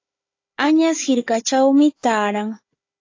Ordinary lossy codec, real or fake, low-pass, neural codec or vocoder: AAC, 32 kbps; fake; 7.2 kHz; codec, 16 kHz, 4 kbps, FunCodec, trained on Chinese and English, 50 frames a second